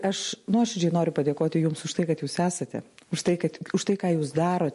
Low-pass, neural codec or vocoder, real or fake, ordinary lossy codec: 14.4 kHz; none; real; MP3, 48 kbps